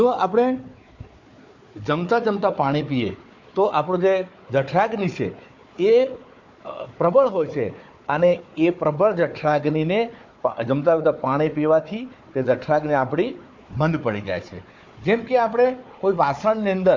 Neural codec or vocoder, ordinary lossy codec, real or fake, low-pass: codec, 16 kHz, 4 kbps, FunCodec, trained on Chinese and English, 50 frames a second; MP3, 48 kbps; fake; 7.2 kHz